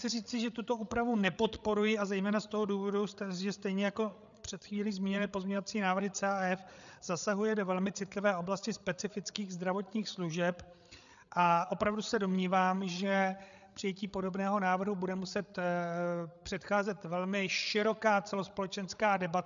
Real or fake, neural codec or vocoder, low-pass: fake; codec, 16 kHz, 8 kbps, FreqCodec, larger model; 7.2 kHz